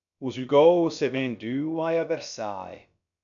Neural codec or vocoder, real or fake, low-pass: codec, 16 kHz, about 1 kbps, DyCAST, with the encoder's durations; fake; 7.2 kHz